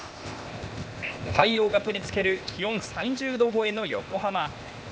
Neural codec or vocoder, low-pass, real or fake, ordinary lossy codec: codec, 16 kHz, 0.8 kbps, ZipCodec; none; fake; none